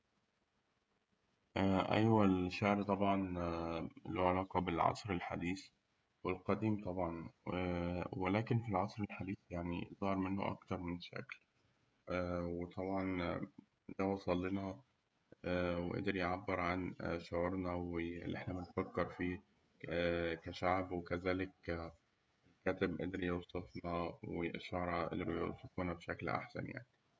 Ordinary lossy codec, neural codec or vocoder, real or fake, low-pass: none; codec, 16 kHz, 16 kbps, FreqCodec, smaller model; fake; none